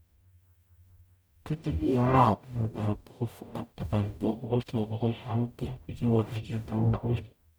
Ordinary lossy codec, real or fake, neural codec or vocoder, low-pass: none; fake; codec, 44.1 kHz, 0.9 kbps, DAC; none